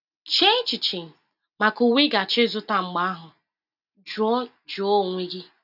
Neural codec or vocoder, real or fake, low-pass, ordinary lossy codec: none; real; 5.4 kHz; none